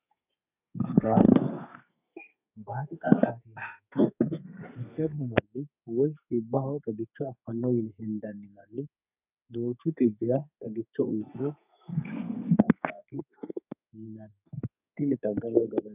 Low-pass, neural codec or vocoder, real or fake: 3.6 kHz; codec, 32 kHz, 1.9 kbps, SNAC; fake